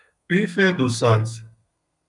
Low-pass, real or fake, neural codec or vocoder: 10.8 kHz; fake; codec, 32 kHz, 1.9 kbps, SNAC